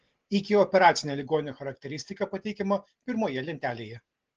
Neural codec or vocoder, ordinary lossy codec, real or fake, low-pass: none; Opus, 16 kbps; real; 7.2 kHz